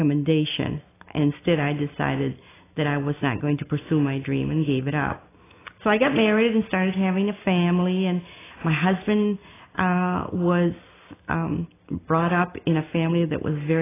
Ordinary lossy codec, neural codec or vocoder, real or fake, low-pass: AAC, 16 kbps; none; real; 3.6 kHz